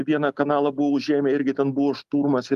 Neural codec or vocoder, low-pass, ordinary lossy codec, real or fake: none; 14.4 kHz; Opus, 32 kbps; real